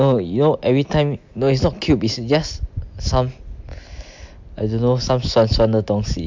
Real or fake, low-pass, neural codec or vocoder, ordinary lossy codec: real; 7.2 kHz; none; MP3, 64 kbps